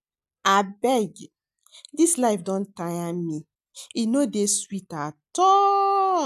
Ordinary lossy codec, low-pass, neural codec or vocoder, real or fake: none; 14.4 kHz; none; real